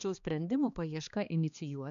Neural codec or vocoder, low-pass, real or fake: codec, 16 kHz, 2 kbps, X-Codec, HuBERT features, trained on balanced general audio; 7.2 kHz; fake